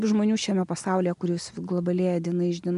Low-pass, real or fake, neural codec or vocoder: 10.8 kHz; real; none